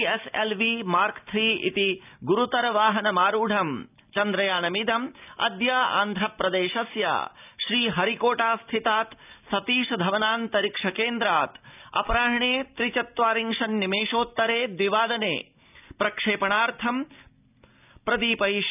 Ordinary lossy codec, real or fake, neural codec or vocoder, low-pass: none; real; none; 3.6 kHz